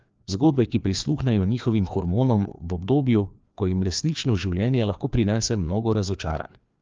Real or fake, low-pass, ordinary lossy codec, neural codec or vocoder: fake; 7.2 kHz; Opus, 24 kbps; codec, 16 kHz, 2 kbps, FreqCodec, larger model